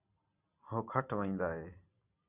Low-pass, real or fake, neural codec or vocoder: 3.6 kHz; real; none